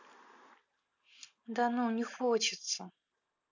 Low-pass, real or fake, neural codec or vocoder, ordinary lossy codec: 7.2 kHz; real; none; none